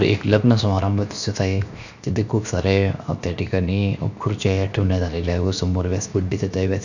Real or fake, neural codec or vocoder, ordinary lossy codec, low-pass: fake; codec, 16 kHz, 0.7 kbps, FocalCodec; none; 7.2 kHz